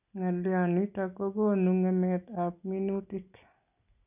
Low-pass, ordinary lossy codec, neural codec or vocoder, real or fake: 3.6 kHz; none; none; real